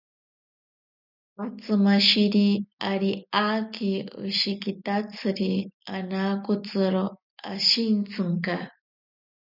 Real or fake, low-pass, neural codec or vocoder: real; 5.4 kHz; none